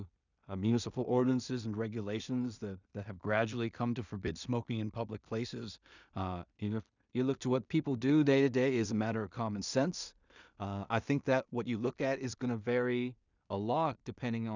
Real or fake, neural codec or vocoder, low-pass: fake; codec, 16 kHz in and 24 kHz out, 0.4 kbps, LongCat-Audio-Codec, two codebook decoder; 7.2 kHz